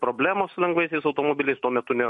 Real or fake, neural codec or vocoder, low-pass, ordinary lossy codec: real; none; 14.4 kHz; MP3, 48 kbps